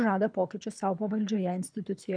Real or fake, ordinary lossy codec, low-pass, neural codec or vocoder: fake; MP3, 96 kbps; 9.9 kHz; codec, 24 kHz, 6 kbps, HILCodec